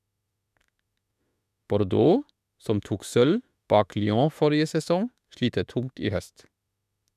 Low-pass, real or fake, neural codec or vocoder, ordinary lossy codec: 14.4 kHz; fake; autoencoder, 48 kHz, 32 numbers a frame, DAC-VAE, trained on Japanese speech; none